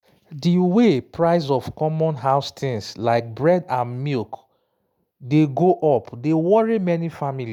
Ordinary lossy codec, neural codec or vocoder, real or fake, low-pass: none; none; real; 19.8 kHz